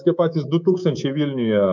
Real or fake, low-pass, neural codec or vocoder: fake; 7.2 kHz; codec, 24 kHz, 3.1 kbps, DualCodec